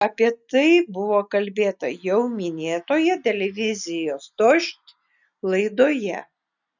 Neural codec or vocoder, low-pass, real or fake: none; 7.2 kHz; real